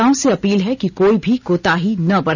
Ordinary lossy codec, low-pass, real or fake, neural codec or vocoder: none; 7.2 kHz; real; none